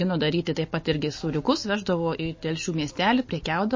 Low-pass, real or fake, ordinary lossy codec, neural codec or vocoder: 7.2 kHz; real; MP3, 32 kbps; none